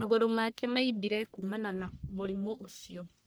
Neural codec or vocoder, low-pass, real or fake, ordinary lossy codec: codec, 44.1 kHz, 1.7 kbps, Pupu-Codec; none; fake; none